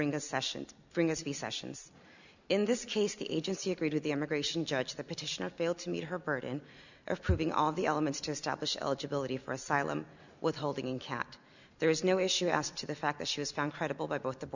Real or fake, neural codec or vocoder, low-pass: real; none; 7.2 kHz